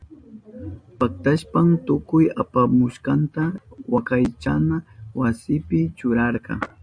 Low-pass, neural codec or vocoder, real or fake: 9.9 kHz; none; real